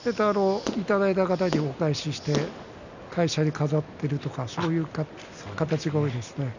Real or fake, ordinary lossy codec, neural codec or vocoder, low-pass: real; none; none; 7.2 kHz